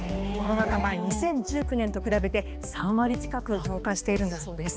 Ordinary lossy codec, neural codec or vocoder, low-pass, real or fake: none; codec, 16 kHz, 2 kbps, X-Codec, HuBERT features, trained on balanced general audio; none; fake